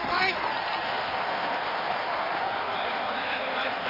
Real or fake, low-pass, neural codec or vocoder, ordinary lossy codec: fake; 5.4 kHz; codec, 16 kHz in and 24 kHz out, 1.1 kbps, FireRedTTS-2 codec; AAC, 32 kbps